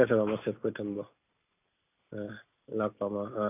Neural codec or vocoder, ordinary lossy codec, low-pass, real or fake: none; none; 3.6 kHz; real